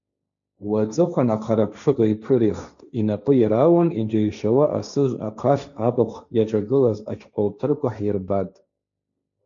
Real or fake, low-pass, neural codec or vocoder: fake; 7.2 kHz; codec, 16 kHz, 1.1 kbps, Voila-Tokenizer